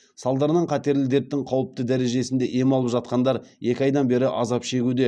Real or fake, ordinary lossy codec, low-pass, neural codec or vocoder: real; none; none; none